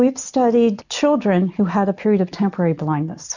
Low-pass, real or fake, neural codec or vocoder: 7.2 kHz; real; none